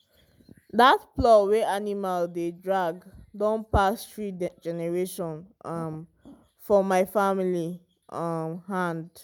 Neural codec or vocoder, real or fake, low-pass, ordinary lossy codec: none; real; none; none